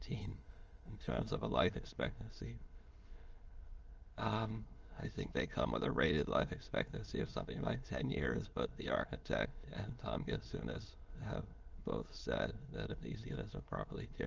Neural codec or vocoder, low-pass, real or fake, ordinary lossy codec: autoencoder, 22.05 kHz, a latent of 192 numbers a frame, VITS, trained on many speakers; 7.2 kHz; fake; Opus, 24 kbps